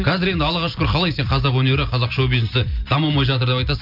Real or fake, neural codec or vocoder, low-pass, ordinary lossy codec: real; none; 5.4 kHz; none